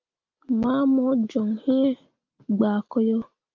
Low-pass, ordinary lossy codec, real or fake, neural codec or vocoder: 7.2 kHz; Opus, 24 kbps; real; none